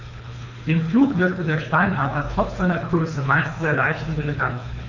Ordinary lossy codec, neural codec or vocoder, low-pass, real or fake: none; codec, 24 kHz, 3 kbps, HILCodec; 7.2 kHz; fake